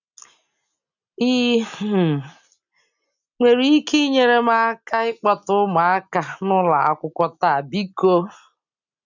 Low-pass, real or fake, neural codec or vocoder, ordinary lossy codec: 7.2 kHz; real; none; none